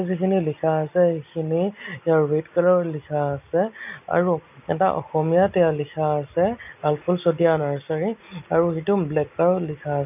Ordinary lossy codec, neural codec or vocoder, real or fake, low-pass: none; none; real; 3.6 kHz